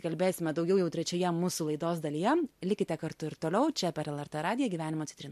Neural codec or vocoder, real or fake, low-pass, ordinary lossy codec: none; real; 14.4 kHz; MP3, 64 kbps